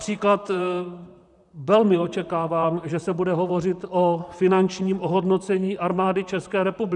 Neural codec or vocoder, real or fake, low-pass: vocoder, 44.1 kHz, 128 mel bands, Pupu-Vocoder; fake; 10.8 kHz